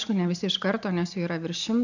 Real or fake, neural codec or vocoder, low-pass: real; none; 7.2 kHz